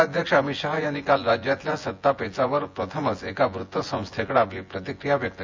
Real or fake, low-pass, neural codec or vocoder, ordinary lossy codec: fake; 7.2 kHz; vocoder, 24 kHz, 100 mel bands, Vocos; MP3, 64 kbps